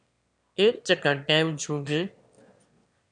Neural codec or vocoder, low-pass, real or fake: autoencoder, 22.05 kHz, a latent of 192 numbers a frame, VITS, trained on one speaker; 9.9 kHz; fake